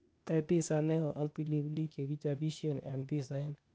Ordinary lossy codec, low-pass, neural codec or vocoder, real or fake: none; none; codec, 16 kHz, 0.8 kbps, ZipCodec; fake